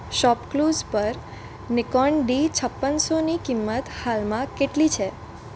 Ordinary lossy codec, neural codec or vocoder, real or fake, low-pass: none; none; real; none